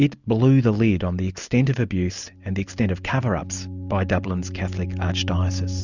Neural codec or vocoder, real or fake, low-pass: none; real; 7.2 kHz